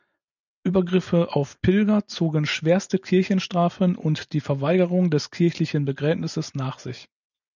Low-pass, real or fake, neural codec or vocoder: 7.2 kHz; real; none